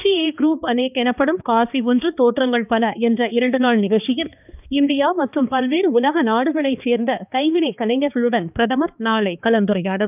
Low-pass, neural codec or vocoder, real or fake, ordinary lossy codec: 3.6 kHz; codec, 16 kHz, 2 kbps, X-Codec, HuBERT features, trained on balanced general audio; fake; none